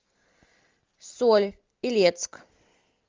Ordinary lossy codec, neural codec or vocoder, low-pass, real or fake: Opus, 24 kbps; none; 7.2 kHz; real